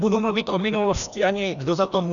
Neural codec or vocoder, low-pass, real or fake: codec, 16 kHz, 1 kbps, FreqCodec, larger model; 7.2 kHz; fake